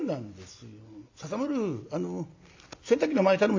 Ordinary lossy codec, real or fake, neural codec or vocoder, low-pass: AAC, 48 kbps; real; none; 7.2 kHz